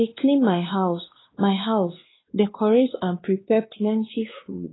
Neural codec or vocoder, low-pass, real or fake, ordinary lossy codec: codec, 16 kHz, 2 kbps, X-Codec, WavLM features, trained on Multilingual LibriSpeech; 7.2 kHz; fake; AAC, 16 kbps